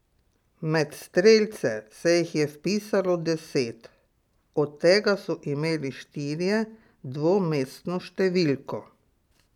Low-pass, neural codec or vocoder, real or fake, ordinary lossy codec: 19.8 kHz; none; real; none